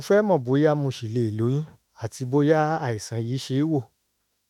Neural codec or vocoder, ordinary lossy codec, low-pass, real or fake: autoencoder, 48 kHz, 32 numbers a frame, DAC-VAE, trained on Japanese speech; none; 19.8 kHz; fake